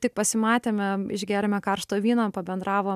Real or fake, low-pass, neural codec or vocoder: real; 14.4 kHz; none